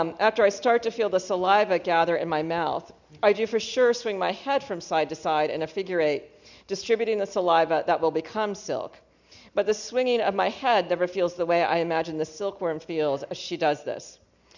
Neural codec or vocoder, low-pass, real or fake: none; 7.2 kHz; real